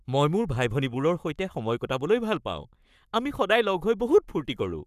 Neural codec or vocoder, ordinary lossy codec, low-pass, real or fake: codec, 44.1 kHz, 7.8 kbps, Pupu-Codec; none; 14.4 kHz; fake